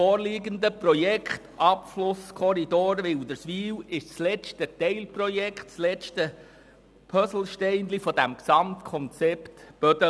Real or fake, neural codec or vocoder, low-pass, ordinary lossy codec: real; none; none; none